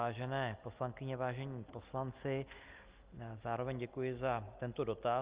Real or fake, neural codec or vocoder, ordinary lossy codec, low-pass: real; none; Opus, 24 kbps; 3.6 kHz